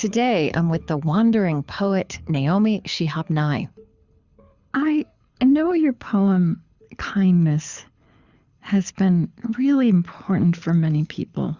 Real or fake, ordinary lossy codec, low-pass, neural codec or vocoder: fake; Opus, 64 kbps; 7.2 kHz; codec, 24 kHz, 6 kbps, HILCodec